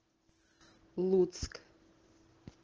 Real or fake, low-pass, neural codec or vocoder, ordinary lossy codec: real; 7.2 kHz; none; Opus, 16 kbps